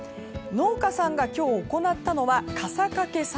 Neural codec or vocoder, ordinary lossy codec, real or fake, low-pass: none; none; real; none